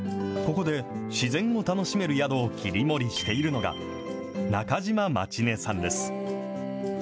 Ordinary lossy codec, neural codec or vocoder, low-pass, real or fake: none; none; none; real